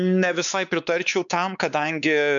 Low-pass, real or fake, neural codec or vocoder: 7.2 kHz; fake; codec, 16 kHz, 4 kbps, X-Codec, WavLM features, trained on Multilingual LibriSpeech